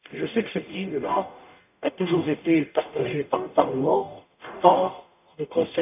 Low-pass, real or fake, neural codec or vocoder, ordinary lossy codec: 3.6 kHz; fake; codec, 44.1 kHz, 0.9 kbps, DAC; none